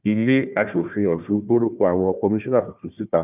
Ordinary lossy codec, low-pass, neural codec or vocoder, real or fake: none; 3.6 kHz; codec, 16 kHz, 1 kbps, FunCodec, trained on Chinese and English, 50 frames a second; fake